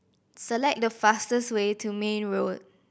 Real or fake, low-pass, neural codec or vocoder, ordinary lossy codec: real; none; none; none